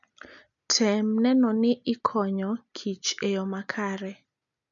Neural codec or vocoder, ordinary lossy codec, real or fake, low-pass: none; none; real; 7.2 kHz